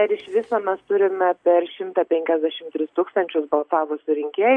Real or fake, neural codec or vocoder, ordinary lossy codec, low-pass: real; none; AAC, 64 kbps; 9.9 kHz